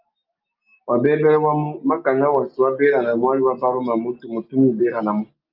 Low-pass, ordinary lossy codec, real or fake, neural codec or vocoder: 5.4 kHz; Opus, 24 kbps; real; none